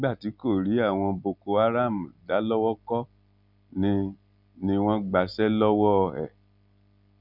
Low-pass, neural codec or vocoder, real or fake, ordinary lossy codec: 5.4 kHz; none; real; none